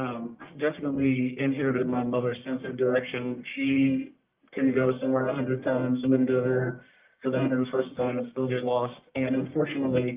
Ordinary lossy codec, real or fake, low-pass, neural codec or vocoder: Opus, 32 kbps; fake; 3.6 kHz; codec, 44.1 kHz, 1.7 kbps, Pupu-Codec